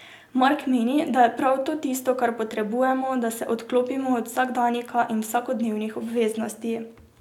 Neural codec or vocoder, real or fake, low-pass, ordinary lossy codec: none; real; 19.8 kHz; none